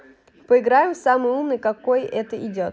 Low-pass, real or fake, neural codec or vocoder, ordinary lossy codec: none; real; none; none